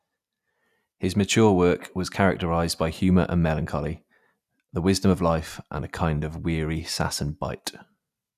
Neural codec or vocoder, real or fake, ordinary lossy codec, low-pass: none; real; none; 14.4 kHz